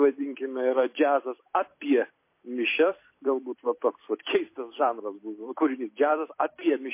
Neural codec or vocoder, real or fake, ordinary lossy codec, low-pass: vocoder, 44.1 kHz, 128 mel bands every 256 samples, BigVGAN v2; fake; MP3, 24 kbps; 3.6 kHz